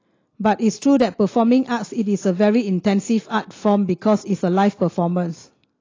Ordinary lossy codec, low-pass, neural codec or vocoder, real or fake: AAC, 32 kbps; 7.2 kHz; none; real